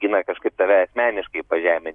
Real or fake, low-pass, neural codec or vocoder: real; 10.8 kHz; none